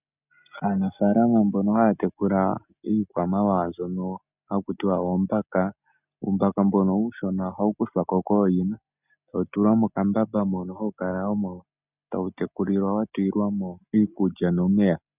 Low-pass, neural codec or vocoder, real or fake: 3.6 kHz; none; real